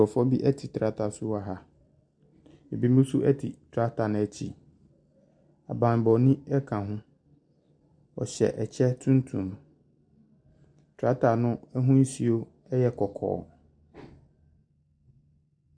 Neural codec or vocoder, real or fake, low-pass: none; real; 9.9 kHz